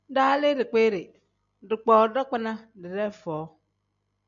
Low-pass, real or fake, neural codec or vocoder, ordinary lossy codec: 7.2 kHz; real; none; MP3, 96 kbps